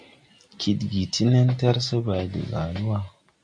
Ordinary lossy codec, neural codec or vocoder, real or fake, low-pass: MP3, 64 kbps; none; real; 9.9 kHz